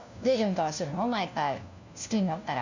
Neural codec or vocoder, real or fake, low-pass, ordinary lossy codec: codec, 16 kHz, 1 kbps, FunCodec, trained on LibriTTS, 50 frames a second; fake; 7.2 kHz; none